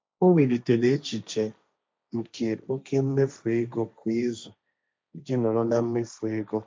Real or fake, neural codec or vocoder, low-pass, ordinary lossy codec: fake; codec, 16 kHz, 1.1 kbps, Voila-Tokenizer; none; none